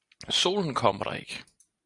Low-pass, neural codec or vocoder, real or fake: 10.8 kHz; none; real